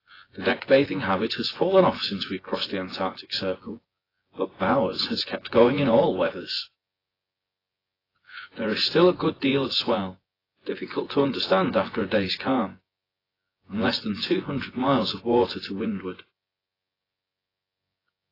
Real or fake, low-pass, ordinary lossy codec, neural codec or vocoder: fake; 5.4 kHz; AAC, 24 kbps; vocoder, 24 kHz, 100 mel bands, Vocos